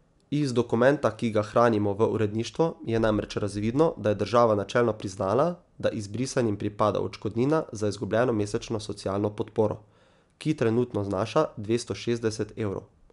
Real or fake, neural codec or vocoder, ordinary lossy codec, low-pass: real; none; MP3, 96 kbps; 10.8 kHz